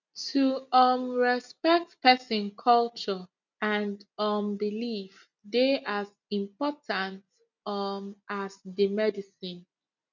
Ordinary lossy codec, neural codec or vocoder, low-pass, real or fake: none; none; 7.2 kHz; real